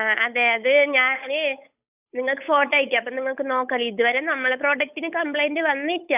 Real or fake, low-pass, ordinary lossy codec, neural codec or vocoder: fake; 3.6 kHz; none; codec, 16 kHz, 8 kbps, FunCodec, trained on Chinese and English, 25 frames a second